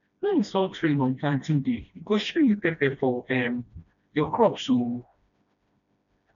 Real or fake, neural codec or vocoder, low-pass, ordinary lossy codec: fake; codec, 16 kHz, 1 kbps, FreqCodec, smaller model; 7.2 kHz; none